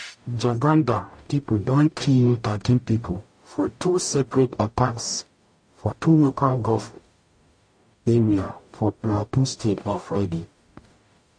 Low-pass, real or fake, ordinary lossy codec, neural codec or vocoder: 9.9 kHz; fake; MP3, 48 kbps; codec, 44.1 kHz, 0.9 kbps, DAC